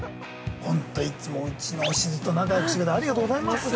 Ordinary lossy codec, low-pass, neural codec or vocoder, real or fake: none; none; none; real